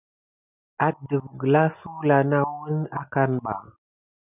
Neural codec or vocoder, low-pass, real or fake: none; 3.6 kHz; real